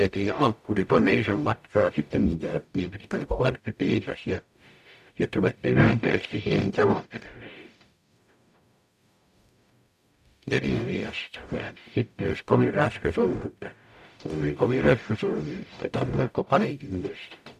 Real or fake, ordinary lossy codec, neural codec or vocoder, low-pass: fake; none; codec, 44.1 kHz, 0.9 kbps, DAC; 14.4 kHz